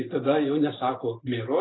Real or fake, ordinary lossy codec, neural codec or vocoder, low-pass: real; AAC, 16 kbps; none; 7.2 kHz